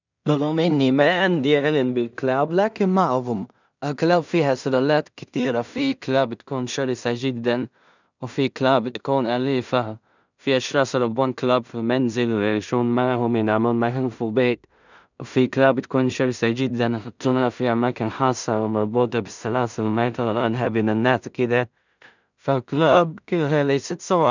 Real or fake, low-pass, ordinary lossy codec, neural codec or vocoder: fake; 7.2 kHz; none; codec, 16 kHz in and 24 kHz out, 0.4 kbps, LongCat-Audio-Codec, two codebook decoder